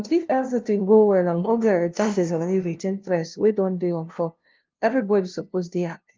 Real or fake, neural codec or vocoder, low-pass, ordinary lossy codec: fake; codec, 16 kHz, 0.5 kbps, FunCodec, trained on LibriTTS, 25 frames a second; 7.2 kHz; Opus, 32 kbps